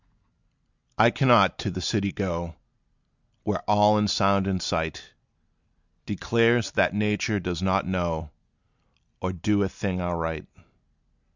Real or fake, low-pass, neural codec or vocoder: real; 7.2 kHz; none